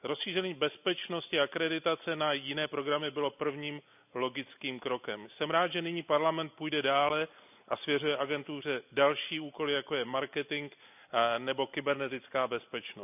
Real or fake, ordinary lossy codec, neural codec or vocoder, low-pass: real; none; none; 3.6 kHz